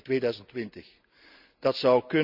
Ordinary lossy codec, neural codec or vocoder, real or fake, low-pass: none; none; real; 5.4 kHz